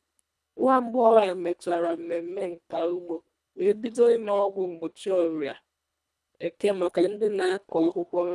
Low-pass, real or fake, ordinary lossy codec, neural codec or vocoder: none; fake; none; codec, 24 kHz, 1.5 kbps, HILCodec